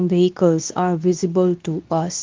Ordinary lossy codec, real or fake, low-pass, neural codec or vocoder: Opus, 32 kbps; fake; 7.2 kHz; codec, 24 kHz, 0.9 kbps, WavTokenizer, medium speech release version 2